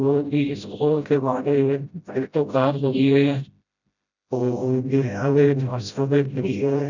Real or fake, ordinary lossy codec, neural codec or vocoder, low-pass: fake; none; codec, 16 kHz, 0.5 kbps, FreqCodec, smaller model; 7.2 kHz